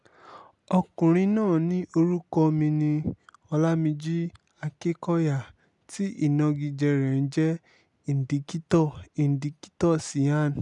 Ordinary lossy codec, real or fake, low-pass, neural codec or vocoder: none; real; 10.8 kHz; none